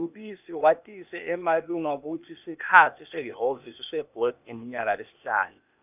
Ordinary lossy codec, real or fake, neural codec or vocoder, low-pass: none; fake; codec, 16 kHz, 0.8 kbps, ZipCodec; 3.6 kHz